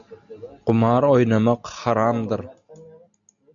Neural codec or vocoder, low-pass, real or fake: none; 7.2 kHz; real